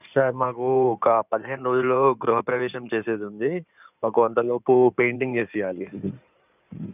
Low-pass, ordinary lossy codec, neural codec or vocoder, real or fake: 3.6 kHz; none; codec, 16 kHz in and 24 kHz out, 2.2 kbps, FireRedTTS-2 codec; fake